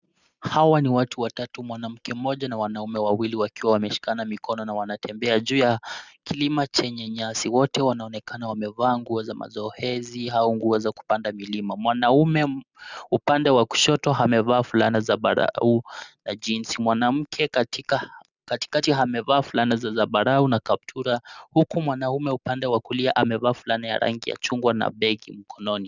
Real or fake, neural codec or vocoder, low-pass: real; none; 7.2 kHz